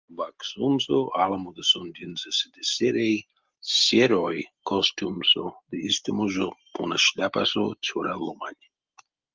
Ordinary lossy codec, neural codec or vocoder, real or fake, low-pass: Opus, 24 kbps; none; real; 7.2 kHz